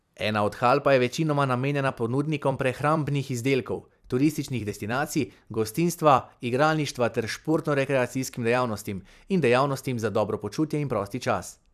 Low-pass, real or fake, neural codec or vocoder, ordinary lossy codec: 14.4 kHz; fake; vocoder, 44.1 kHz, 128 mel bands every 256 samples, BigVGAN v2; none